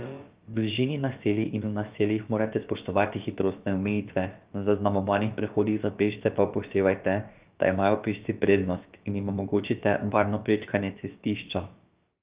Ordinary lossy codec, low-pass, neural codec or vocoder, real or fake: Opus, 24 kbps; 3.6 kHz; codec, 16 kHz, about 1 kbps, DyCAST, with the encoder's durations; fake